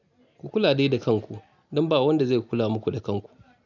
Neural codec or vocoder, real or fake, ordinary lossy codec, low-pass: none; real; none; 7.2 kHz